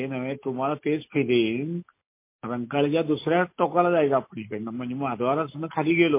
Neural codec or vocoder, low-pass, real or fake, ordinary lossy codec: none; 3.6 kHz; real; MP3, 24 kbps